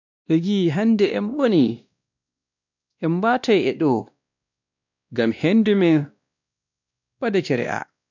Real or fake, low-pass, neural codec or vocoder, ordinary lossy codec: fake; 7.2 kHz; codec, 16 kHz, 1 kbps, X-Codec, WavLM features, trained on Multilingual LibriSpeech; none